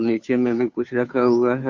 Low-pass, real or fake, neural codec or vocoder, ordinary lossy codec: 7.2 kHz; fake; codec, 16 kHz, 2 kbps, FunCodec, trained on Chinese and English, 25 frames a second; MP3, 48 kbps